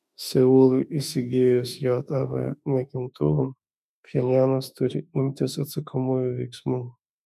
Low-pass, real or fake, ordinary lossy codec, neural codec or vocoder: 14.4 kHz; fake; MP3, 96 kbps; autoencoder, 48 kHz, 32 numbers a frame, DAC-VAE, trained on Japanese speech